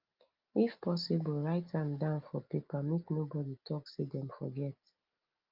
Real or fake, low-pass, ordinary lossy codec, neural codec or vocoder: real; 5.4 kHz; Opus, 24 kbps; none